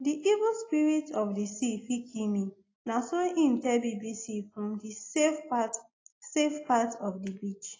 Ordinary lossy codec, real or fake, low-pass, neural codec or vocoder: AAC, 32 kbps; real; 7.2 kHz; none